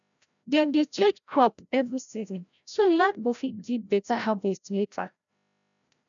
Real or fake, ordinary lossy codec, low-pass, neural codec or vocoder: fake; none; 7.2 kHz; codec, 16 kHz, 0.5 kbps, FreqCodec, larger model